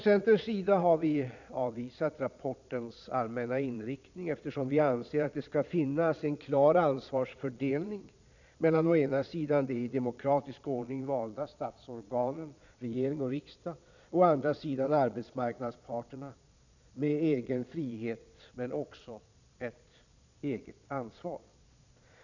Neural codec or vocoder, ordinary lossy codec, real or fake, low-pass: vocoder, 22.05 kHz, 80 mel bands, Vocos; none; fake; 7.2 kHz